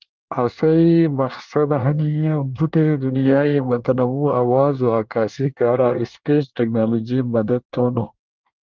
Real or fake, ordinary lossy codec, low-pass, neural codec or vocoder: fake; Opus, 32 kbps; 7.2 kHz; codec, 24 kHz, 1 kbps, SNAC